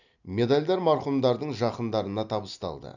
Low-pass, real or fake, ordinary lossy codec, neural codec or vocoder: 7.2 kHz; real; none; none